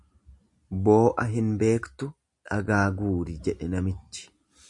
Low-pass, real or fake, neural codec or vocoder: 10.8 kHz; real; none